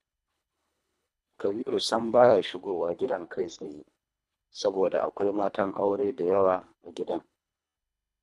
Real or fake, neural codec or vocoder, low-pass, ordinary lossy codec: fake; codec, 24 kHz, 1.5 kbps, HILCodec; none; none